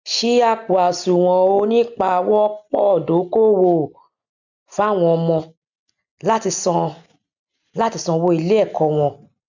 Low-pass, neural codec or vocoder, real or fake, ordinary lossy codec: 7.2 kHz; none; real; none